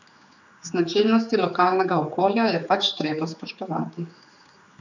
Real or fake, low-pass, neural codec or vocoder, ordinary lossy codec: fake; 7.2 kHz; codec, 16 kHz, 4 kbps, X-Codec, HuBERT features, trained on general audio; none